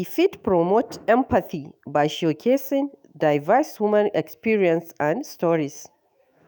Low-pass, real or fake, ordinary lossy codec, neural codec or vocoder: none; fake; none; autoencoder, 48 kHz, 128 numbers a frame, DAC-VAE, trained on Japanese speech